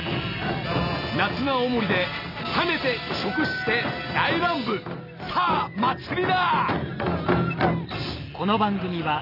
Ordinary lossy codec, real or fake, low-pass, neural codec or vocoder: none; real; 5.4 kHz; none